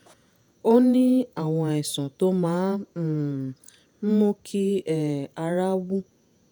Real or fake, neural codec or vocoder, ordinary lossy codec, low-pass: fake; vocoder, 48 kHz, 128 mel bands, Vocos; none; none